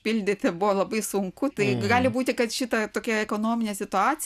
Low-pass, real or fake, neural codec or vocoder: 14.4 kHz; real; none